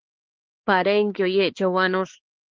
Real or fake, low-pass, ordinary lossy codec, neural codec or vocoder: fake; 7.2 kHz; Opus, 16 kbps; codec, 16 kHz, 4 kbps, X-Codec, HuBERT features, trained on balanced general audio